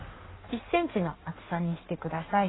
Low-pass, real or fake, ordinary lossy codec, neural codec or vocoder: 7.2 kHz; fake; AAC, 16 kbps; codec, 16 kHz in and 24 kHz out, 1.1 kbps, FireRedTTS-2 codec